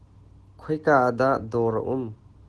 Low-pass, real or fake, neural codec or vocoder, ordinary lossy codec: 10.8 kHz; real; none; Opus, 16 kbps